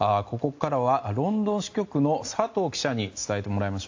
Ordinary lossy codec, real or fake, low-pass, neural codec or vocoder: AAC, 48 kbps; real; 7.2 kHz; none